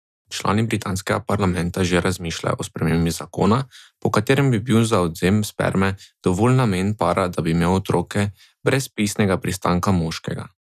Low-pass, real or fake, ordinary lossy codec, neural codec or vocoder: 14.4 kHz; real; none; none